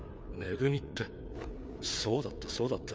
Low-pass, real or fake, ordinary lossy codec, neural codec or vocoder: none; fake; none; codec, 16 kHz, 4 kbps, FreqCodec, larger model